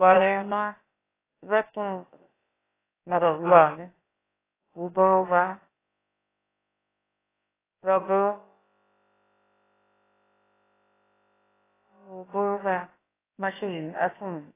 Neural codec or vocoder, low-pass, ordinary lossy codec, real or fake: codec, 16 kHz, about 1 kbps, DyCAST, with the encoder's durations; 3.6 kHz; AAC, 16 kbps; fake